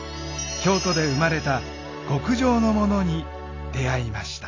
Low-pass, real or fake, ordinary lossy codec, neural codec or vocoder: 7.2 kHz; real; AAC, 32 kbps; none